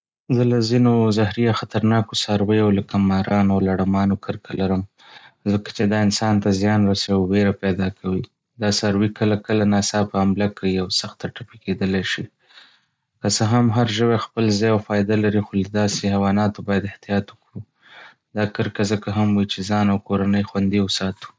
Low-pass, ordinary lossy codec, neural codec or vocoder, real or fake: none; none; none; real